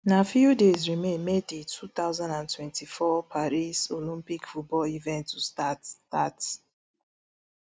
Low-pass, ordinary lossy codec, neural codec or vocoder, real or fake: none; none; none; real